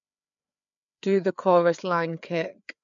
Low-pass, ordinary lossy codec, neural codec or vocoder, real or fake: 7.2 kHz; MP3, 64 kbps; codec, 16 kHz, 4 kbps, FreqCodec, larger model; fake